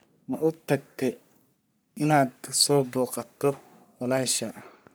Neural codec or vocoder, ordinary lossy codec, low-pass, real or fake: codec, 44.1 kHz, 3.4 kbps, Pupu-Codec; none; none; fake